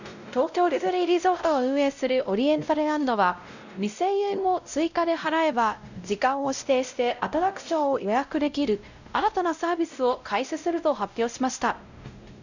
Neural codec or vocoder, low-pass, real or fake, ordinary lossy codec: codec, 16 kHz, 0.5 kbps, X-Codec, WavLM features, trained on Multilingual LibriSpeech; 7.2 kHz; fake; none